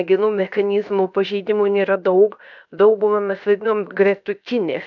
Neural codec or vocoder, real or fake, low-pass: codec, 16 kHz, 0.7 kbps, FocalCodec; fake; 7.2 kHz